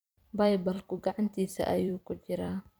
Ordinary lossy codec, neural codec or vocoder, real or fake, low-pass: none; vocoder, 44.1 kHz, 128 mel bands every 256 samples, BigVGAN v2; fake; none